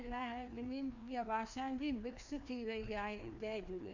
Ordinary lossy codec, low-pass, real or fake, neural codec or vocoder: none; 7.2 kHz; fake; codec, 16 kHz, 2 kbps, FreqCodec, larger model